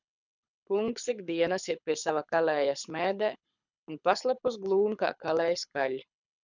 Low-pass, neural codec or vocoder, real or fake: 7.2 kHz; codec, 24 kHz, 6 kbps, HILCodec; fake